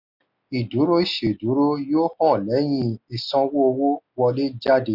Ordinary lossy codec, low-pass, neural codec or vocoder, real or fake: none; 5.4 kHz; none; real